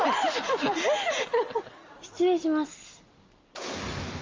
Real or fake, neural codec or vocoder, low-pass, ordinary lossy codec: real; none; 7.2 kHz; Opus, 32 kbps